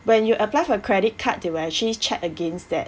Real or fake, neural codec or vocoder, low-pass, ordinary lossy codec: real; none; none; none